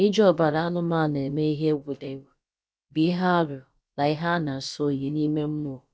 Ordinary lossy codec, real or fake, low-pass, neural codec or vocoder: none; fake; none; codec, 16 kHz, about 1 kbps, DyCAST, with the encoder's durations